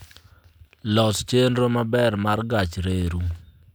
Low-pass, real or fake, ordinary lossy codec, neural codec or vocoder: none; real; none; none